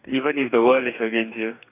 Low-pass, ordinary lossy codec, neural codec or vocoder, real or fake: 3.6 kHz; none; codec, 44.1 kHz, 2.6 kbps, DAC; fake